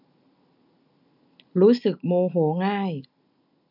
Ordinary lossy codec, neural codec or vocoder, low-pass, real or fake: none; none; 5.4 kHz; real